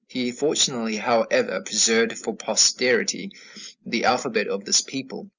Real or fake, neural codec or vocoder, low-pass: real; none; 7.2 kHz